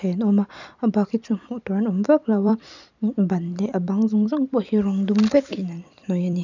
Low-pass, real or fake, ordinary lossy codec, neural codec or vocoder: 7.2 kHz; fake; none; vocoder, 44.1 kHz, 128 mel bands every 256 samples, BigVGAN v2